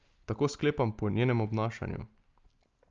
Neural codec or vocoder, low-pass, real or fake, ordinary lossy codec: none; 7.2 kHz; real; Opus, 24 kbps